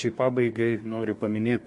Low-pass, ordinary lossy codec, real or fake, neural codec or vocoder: 10.8 kHz; MP3, 48 kbps; fake; codec, 24 kHz, 1 kbps, SNAC